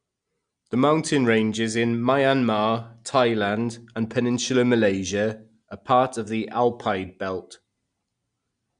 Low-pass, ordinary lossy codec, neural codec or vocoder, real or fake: 9.9 kHz; Opus, 64 kbps; none; real